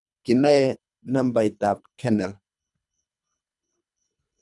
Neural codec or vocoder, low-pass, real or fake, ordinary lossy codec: codec, 24 kHz, 3 kbps, HILCodec; 10.8 kHz; fake; none